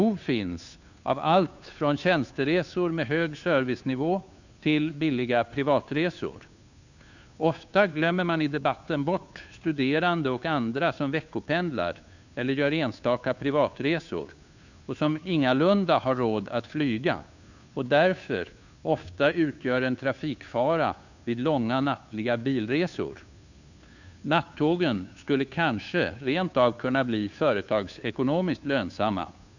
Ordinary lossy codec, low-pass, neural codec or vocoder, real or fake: none; 7.2 kHz; codec, 16 kHz, 2 kbps, FunCodec, trained on Chinese and English, 25 frames a second; fake